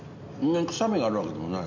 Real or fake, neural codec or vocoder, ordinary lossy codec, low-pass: real; none; none; 7.2 kHz